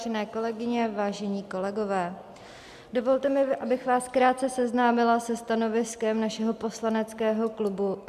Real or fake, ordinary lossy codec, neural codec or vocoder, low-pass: real; AAC, 96 kbps; none; 14.4 kHz